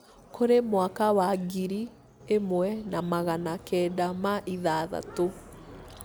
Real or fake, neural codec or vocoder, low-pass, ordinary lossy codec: real; none; none; none